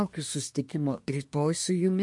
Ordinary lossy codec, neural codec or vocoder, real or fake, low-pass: MP3, 48 kbps; codec, 24 kHz, 1 kbps, SNAC; fake; 10.8 kHz